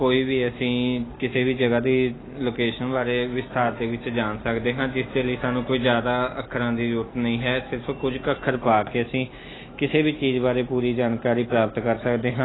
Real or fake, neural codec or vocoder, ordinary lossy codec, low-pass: real; none; AAC, 16 kbps; 7.2 kHz